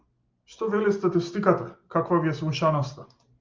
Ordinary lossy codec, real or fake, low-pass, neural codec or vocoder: Opus, 32 kbps; real; 7.2 kHz; none